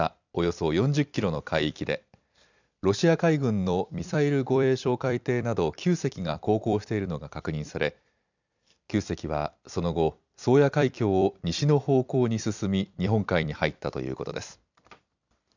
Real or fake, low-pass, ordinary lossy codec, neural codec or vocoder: fake; 7.2 kHz; none; vocoder, 44.1 kHz, 128 mel bands every 256 samples, BigVGAN v2